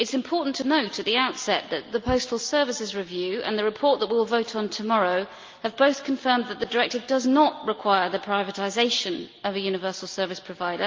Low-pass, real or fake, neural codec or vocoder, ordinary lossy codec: 7.2 kHz; real; none; Opus, 24 kbps